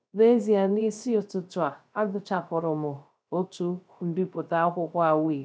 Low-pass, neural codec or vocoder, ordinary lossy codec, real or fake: none; codec, 16 kHz, 0.3 kbps, FocalCodec; none; fake